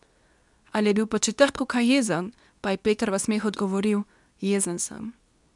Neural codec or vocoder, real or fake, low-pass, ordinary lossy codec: codec, 24 kHz, 0.9 kbps, WavTokenizer, medium speech release version 2; fake; 10.8 kHz; none